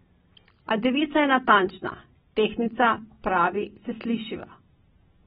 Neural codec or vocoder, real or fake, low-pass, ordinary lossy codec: none; real; 7.2 kHz; AAC, 16 kbps